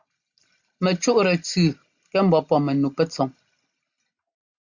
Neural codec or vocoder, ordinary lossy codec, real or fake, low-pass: none; Opus, 64 kbps; real; 7.2 kHz